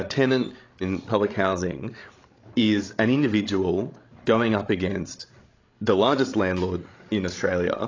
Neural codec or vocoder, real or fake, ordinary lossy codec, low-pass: codec, 16 kHz, 16 kbps, FreqCodec, larger model; fake; AAC, 32 kbps; 7.2 kHz